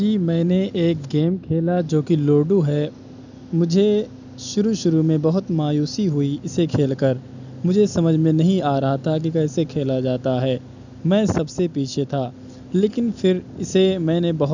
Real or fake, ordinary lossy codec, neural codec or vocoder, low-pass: real; none; none; 7.2 kHz